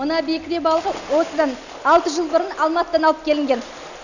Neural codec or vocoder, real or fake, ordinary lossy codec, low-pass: none; real; none; 7.2 kHz